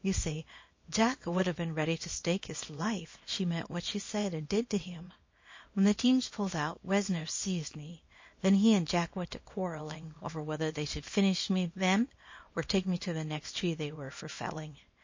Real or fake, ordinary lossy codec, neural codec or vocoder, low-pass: fake; MP3, 32 kbps; codec, 24 kHz, 0.9 kbps, WavTokenizer, small release; 7.2 kHz